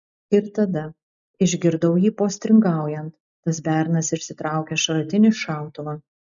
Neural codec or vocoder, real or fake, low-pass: none; real; 7.2 kHz